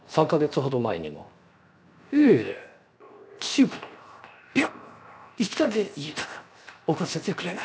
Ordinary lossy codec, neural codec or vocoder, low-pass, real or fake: none; codec, 16 kHz, 0.7 kbps, FocalCodec; none; fake